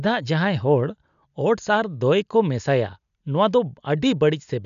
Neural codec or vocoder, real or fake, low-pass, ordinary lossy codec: none; real; 7.2 kHz; none